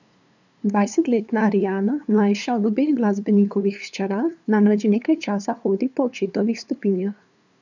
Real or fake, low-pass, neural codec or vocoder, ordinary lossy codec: fake; 7.2 kHz; codec, 16 kHz, 2 kbps, FunCodec, trained on LibriTTS, 25 frames a second; none